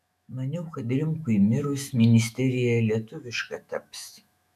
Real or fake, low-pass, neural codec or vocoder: fake; 14.4 kHz; autoencoder, 48 kHz, 128 numbers a frame, DAC-VAE, trained on Japanese speech